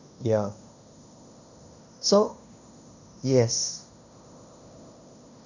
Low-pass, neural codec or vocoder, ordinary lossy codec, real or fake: 7.2 kHz; codec, 16 kHz in and 24 kHz out, 0.9 kbps, LongCat-Audio-Codec, fine tuned four codebook decoder; none; fake